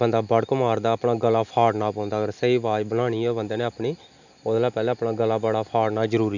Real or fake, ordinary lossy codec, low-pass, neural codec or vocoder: real; none; 7.2 kHz; none